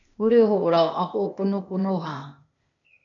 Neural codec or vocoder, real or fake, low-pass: codec, 16 kHz, 0.8 kbps, ZipCodec; fake; 7.2 kHz